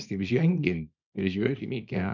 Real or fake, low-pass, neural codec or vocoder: fake; 7.2 kHz; codec, 24 kHz, 0.9 kbps, WavTokenizer, small release